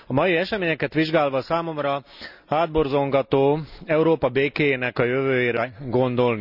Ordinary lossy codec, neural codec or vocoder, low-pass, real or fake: none; none; 5.4 kHz; real